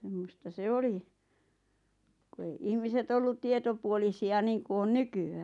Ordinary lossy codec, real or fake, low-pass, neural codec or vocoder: none; real; 10.8 kHz; none